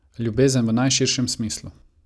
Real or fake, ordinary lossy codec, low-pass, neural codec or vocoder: real; none; none; none